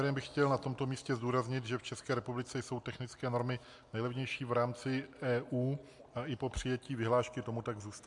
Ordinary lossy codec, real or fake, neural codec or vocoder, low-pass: MP3, 64 kbps; real; none; 10.8 kHz